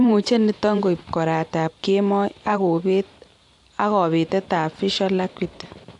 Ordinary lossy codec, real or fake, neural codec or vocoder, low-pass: AAC, 64 kbps; fake; vocoder, 44.1 kHz, 128 mel bands every 512 samples, BigVGAN v2; 10.8 kHz